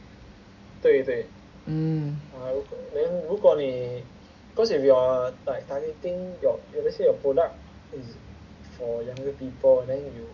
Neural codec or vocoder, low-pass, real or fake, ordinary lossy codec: none; 7.2 kHz; real; none